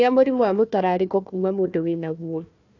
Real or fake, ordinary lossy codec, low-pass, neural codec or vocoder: fake; AAC, 48 kbps; 7.2 kHz; codec, 16 kHz, 1 kbps, FunCodec, trained on Chinese and English, 50 frames a second